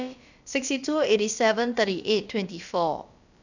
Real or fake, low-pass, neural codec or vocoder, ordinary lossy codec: fake; 7.2 kHz; codec, 16 kHz, about 1 kbps, DyCAST, with the encoder's durations; none